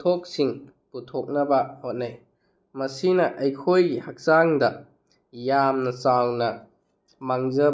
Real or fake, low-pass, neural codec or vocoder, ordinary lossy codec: real; none; none; none